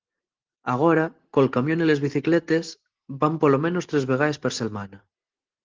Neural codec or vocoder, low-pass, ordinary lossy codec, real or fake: none; 7.2 kHz; Opus, 16 kbps; real